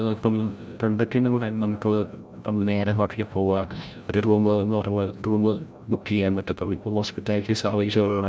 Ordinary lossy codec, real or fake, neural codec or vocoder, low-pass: none; fake; codec, 16 kHz, 0.5 kbps, FreqCodec, larger model; none